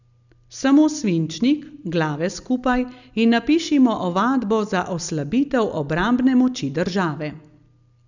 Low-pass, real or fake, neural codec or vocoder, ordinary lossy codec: 7.2 kHz; real; none; none